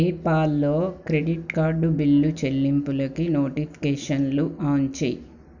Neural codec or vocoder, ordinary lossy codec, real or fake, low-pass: none; none; real; 7.2 kHz